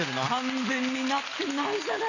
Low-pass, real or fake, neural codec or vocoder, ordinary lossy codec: 7.2 kHz; real; none; none